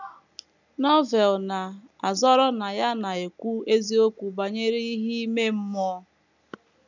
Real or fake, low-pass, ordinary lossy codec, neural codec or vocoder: real; 7.2 kHz; none; none